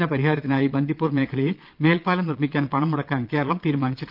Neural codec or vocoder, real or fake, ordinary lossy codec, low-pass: vocoder, 22.05 kHz, 80 mel bands, Vocos; fake; Opus, 24 kbps; 5.4 kHz